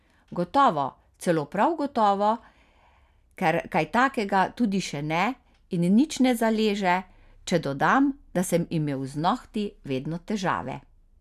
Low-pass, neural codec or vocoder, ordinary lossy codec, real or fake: 14.4 kHz; none; none; real